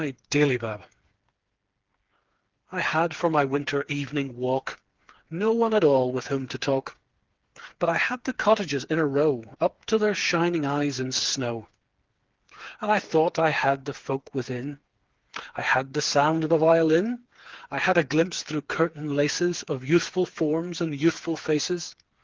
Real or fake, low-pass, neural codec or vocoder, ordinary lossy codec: fake; 7.2 kHz; codec, 16 kHz, 4 kbps, FreqCodec, smaller model; Opus, 16 kbps